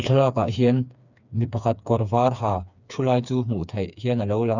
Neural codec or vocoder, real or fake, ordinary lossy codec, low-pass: codec, 16 kHz, 4 kbps, FreqCodec, smaller model; fake; none; 7.2 kHz